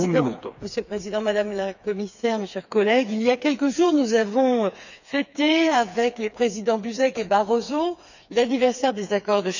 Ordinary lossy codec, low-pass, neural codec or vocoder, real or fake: none; 7.2 kHz; codec, 16 kHz, 4 kbps, FreqCodec, smaller model; fake